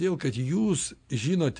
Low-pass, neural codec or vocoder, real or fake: 9.9 kHz; none; real